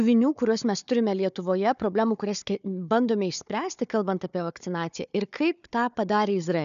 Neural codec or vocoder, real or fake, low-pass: codec, 16 kHz, 4 kbps, FunCodec, trained on Chinese and English, 50 frames a second; fake; 7.2 kHz